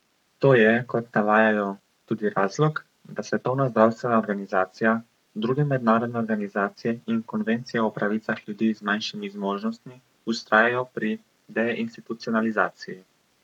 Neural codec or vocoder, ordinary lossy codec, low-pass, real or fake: codec, 44.1 kHz, 7.8 kbps, Pupu-Codec; none; 19.8 kHz; fake